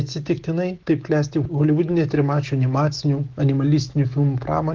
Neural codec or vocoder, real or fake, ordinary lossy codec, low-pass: autoencoder, 48 kHz, 128 numbers a frame, DAC-VAE, trained on Japanese speech; fake; Opus, 16 kbps; 7.2 kHz